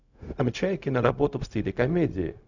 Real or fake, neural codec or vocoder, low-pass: fake; codec, 16 kHz, 0.4 kbps, LongCat-Audio-Codec; 7.2 kHz